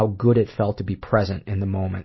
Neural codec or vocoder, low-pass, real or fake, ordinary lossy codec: none; 7.2 kHz; real; MP3, 24 kbps